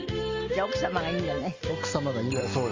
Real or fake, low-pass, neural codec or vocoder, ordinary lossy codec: real; 7.2 kHz; none; Opus, 32 kbps